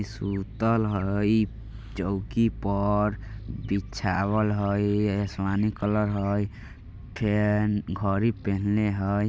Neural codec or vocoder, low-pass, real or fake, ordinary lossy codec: none; none; real; none